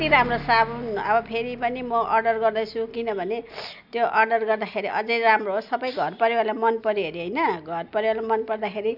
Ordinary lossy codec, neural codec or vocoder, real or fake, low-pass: none; none; real; 5.4 kHz